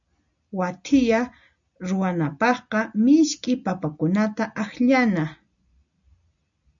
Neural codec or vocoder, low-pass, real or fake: none; 7.2 kHz; real